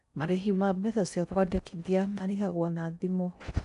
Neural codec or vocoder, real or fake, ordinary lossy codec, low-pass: codec, 16 kHz in and 24 kHz out, 0.6 kbps, FocalCodec, streaming, 4096 codes; fake; none; 10.8 kHz